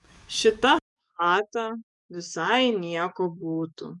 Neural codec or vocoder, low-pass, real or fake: codec, 44.1 kHz, 7.8 kbps, DAC; 10.8 kHz; fake